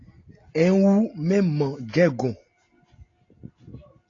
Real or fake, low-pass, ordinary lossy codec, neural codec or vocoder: real; 7.2 kHz; AAC, 32 kbps; none